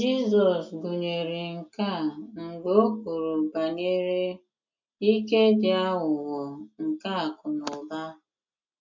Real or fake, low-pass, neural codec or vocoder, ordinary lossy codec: real; 7.2 kHz; none; MP3, 64 kbps